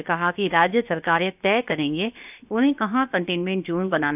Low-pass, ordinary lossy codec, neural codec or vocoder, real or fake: 3.6 kHz; none; codec, 16 kHz, 0.7 kbps, FocalCodec; fake